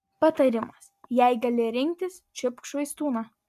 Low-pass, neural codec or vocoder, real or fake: 14.4 kHz; none; real